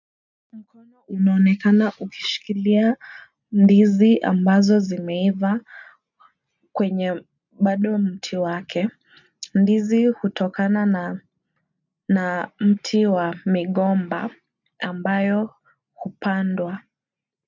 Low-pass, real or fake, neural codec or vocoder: 7.2 kHz; real; none